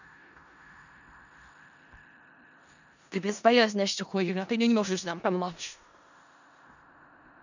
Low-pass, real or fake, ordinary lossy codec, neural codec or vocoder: 7.2 kHz; fake; none; codec, 16 kHz in and 24 kHz out, 0.4 kbps, LongCat-Audio-Codec, four codebook decoder